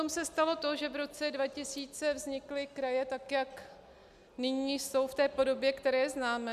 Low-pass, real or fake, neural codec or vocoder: 14.4 kHz; real; none